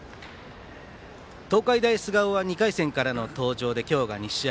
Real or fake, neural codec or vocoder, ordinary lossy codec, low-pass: real; none; none; none